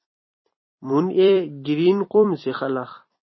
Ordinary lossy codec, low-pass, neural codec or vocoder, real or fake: MP3, 24 kbps; 7.2 kHz; vocoder, 44.1 kHz, 128 mel bands every 256 samples, BigVGAN v2; fake